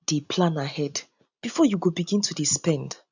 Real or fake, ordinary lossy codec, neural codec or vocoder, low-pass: real; none; none; 7.2 kHz